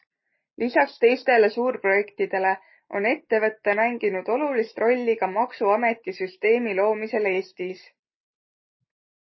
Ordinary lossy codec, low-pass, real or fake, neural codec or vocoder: MP3, 24 kbps; 7.2 kHz; real; none